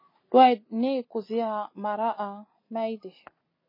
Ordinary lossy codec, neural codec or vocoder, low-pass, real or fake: MP3, 24 kbps; none; 5.4 kHz; real